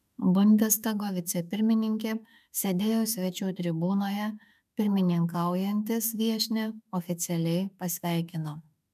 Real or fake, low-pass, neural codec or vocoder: fake; 14.4 kHz; autoencoder, 48 kHz, 32 numbers a frame, DAC-VAE, trained on Japanese speech